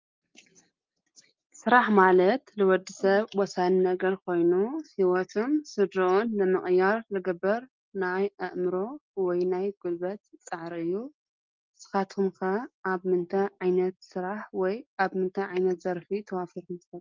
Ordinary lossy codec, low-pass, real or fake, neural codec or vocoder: Opus, 24 kbps; 7.2 kHz; real; none